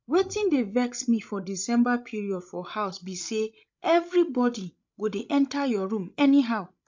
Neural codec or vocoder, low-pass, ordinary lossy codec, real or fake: codec, 16 kHz, 16 kbps, FreqCodec, larger model; 7.2 kHz; MP3, 64 kbps; fake